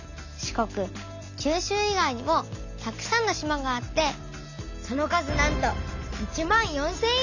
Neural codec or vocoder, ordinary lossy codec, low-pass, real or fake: none; none; 7.2 kHz; real